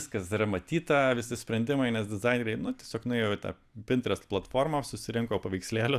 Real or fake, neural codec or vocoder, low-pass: real; none; 14.4 kHz